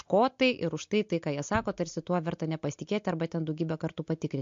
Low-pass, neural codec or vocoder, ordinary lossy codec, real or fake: 7.2 kHz; none; MP3, 48 kbps; real